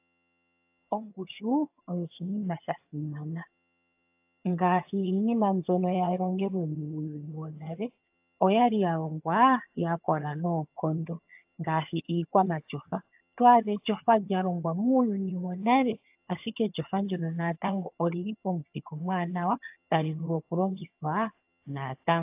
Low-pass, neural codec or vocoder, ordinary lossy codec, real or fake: 3.6 kHz; vocoder, 22.05 kHz, 80 mel bands, HiFi-GAN; AAC, 32 kbps; fake